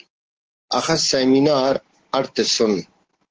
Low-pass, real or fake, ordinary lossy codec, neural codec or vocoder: 7.2 kHz; real; Opus, 16 kbps; none